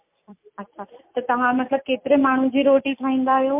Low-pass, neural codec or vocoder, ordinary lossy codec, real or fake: 3.6 kHz; none; MP3, 32 kbps; real